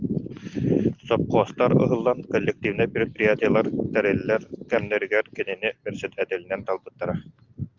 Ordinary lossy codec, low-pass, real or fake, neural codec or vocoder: Opus, 24 kbps; 7.2 kHz; real; none